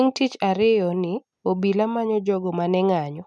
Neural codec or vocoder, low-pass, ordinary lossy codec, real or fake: none; none; none; real